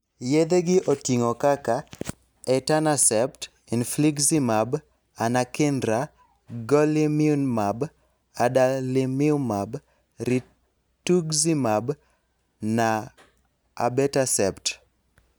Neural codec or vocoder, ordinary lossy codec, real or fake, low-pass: none; none; real; none